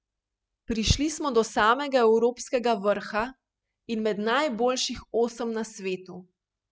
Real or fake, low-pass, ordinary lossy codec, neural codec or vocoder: real; none; none; none